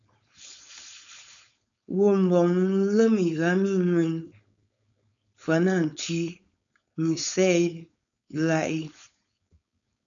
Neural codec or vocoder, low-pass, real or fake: codec, 16 kHz, 4.8 kbps, FACodec; 7.2 kHz; fake